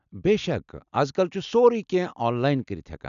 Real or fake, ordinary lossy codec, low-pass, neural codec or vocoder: real; none; 7.2 kHz; none